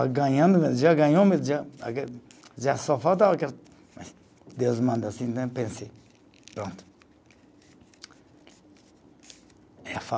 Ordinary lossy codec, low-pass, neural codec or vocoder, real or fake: none; none; none; real